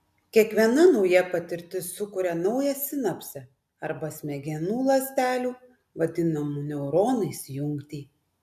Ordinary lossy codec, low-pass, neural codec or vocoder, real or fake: MP3, 96 kbps; 14.4 kHz; none; real